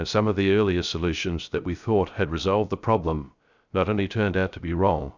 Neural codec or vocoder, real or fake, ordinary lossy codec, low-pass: codec, 16 kHz, 0.3 kbps, FocalCodec; fake; Opus, 64 kbps; 7.2 kHz